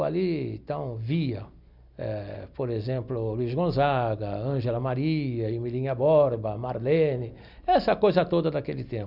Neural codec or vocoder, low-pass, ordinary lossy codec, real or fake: none; 5.4 kHz; none; real